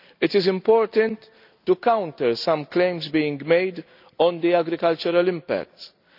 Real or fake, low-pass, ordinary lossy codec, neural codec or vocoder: real; 5.4 kHz; none; none